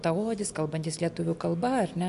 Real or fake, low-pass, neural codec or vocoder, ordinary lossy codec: real; 10.8 kHz; none; AAC, 64 kbps